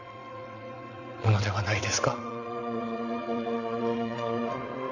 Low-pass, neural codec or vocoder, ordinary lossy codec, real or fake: 7.2 kHz; vocoder, 22.05 kHz, 80 mel bands, WaveNeXt; none; fake